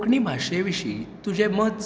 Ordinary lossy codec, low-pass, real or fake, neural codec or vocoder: none; none; real; none